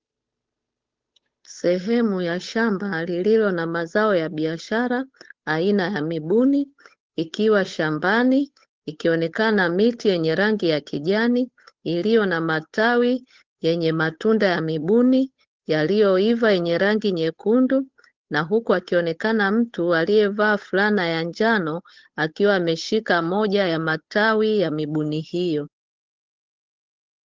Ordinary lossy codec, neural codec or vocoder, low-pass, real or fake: Opus, 16 kbps; codec, 16 kHz, 8 kbps, FunCodec, trained on Chinese and English, 25 frames a second; 7.2 kHz; fake